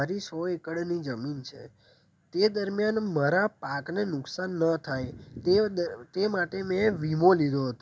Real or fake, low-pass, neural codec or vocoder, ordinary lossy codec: real; none; none; none